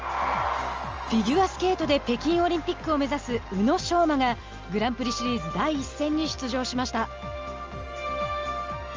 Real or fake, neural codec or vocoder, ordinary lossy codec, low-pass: real; none; Opus, 24 kbps; 7.2 kHz